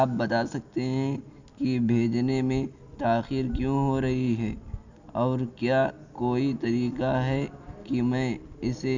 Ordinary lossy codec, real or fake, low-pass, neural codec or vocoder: none; real; 7.2 kHz; none